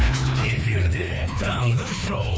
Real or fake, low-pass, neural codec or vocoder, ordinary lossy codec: fake; none; codec, 16 kHz, 2 kbps, FreqCodec, larger model; none